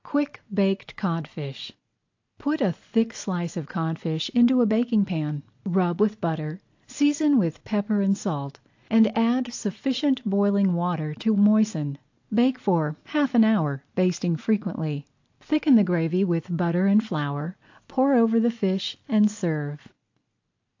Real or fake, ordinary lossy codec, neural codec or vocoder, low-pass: real; AAC, 48 kbps; none; 7.2 kHz